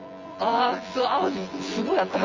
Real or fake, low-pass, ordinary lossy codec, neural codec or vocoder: fake; 7.2 kHz; Opus, 32 kbps; vocoder, 24 kHz, 100 mel bands, Vocos